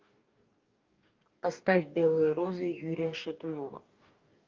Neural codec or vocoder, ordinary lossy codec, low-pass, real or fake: codec, 44.1 kHz, 2.6 kbps, DAC; Opus, 24 kbps; 7.2 kHz; fake